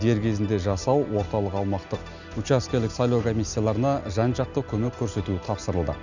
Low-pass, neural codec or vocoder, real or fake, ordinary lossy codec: 7.2 kHz; none; real; none